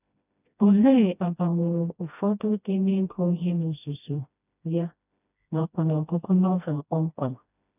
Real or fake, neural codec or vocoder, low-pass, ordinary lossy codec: fake; codec, 16 kHz, 1 kbps, FreqCodec, smaller model; 3.6 kHz; none